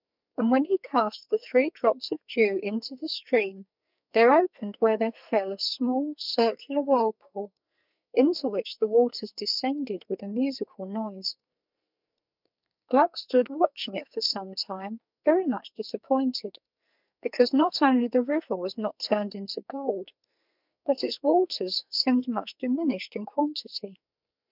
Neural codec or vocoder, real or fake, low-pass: codec, 44.1 kHz, 2.6 kbps, SNAC; fake; 5.4 kHz